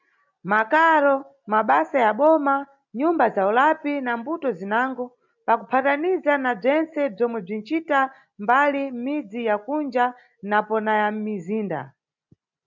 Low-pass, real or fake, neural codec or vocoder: 7.2 kHz; real; none